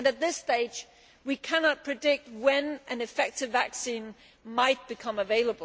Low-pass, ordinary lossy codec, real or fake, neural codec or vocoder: none; none; real; none